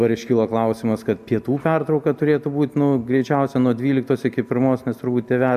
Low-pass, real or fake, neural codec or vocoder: 14.4 kHz; real; none